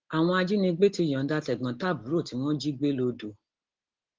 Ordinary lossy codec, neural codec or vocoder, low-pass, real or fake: Opus, 16 kbps; none; 7.2 kHz; real